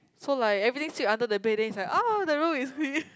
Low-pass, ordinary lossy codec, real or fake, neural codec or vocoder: none; none; real; none